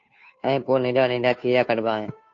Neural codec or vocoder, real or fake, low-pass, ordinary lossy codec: codec, 16 kHz, 2 kbps, FunCodec, trained on Chinese and English, 25 frames a second; fake; 7.2 kHz; AAC, 48 kbps